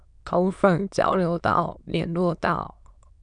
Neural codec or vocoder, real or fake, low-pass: autoencoder, 22.05 kHz, a latent of 192 numbers a frame, VITS, trained on many speakers; fake; 9.9 kHz